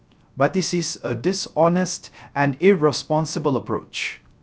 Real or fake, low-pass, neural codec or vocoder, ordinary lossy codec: fake; none; codec, 16 kHz, 0.3 kbps, FocalCodec; none